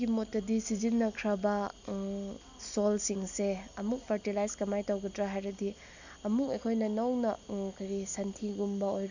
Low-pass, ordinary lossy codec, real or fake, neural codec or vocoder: 7.2 kHz; none; real; none